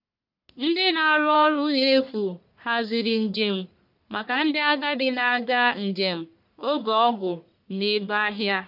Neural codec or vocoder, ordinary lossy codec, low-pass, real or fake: codec, 44.1 kHz, 1.7 kbps, Pupu-Codec; none; 5.4 kHz; fake